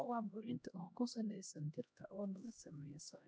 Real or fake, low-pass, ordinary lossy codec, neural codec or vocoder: fake; none; none; codec, 16 kHz, 1 kbps, X-Codec, HuBERT features, trained on LibriSpeech